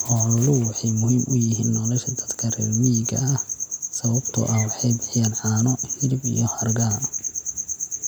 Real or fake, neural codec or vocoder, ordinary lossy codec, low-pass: real; none; none; none